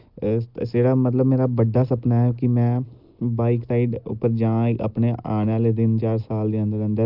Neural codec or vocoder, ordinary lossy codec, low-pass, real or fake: none; Opus, 32 kbps; 5.4 kHz; real